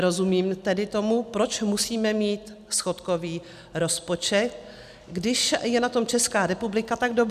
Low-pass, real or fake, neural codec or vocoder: 14.4 kHz; real; none